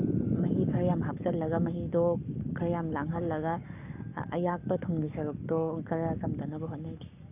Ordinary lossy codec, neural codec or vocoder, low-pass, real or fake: none; codec, 44.1 kHz, 7.8 kbps, Pupu-Codec; 3.6 kHz; fake